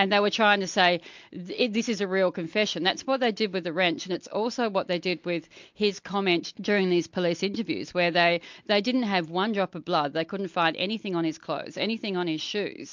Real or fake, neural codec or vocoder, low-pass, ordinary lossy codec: real; none; 7.2 kHz; MP3, 64 kbps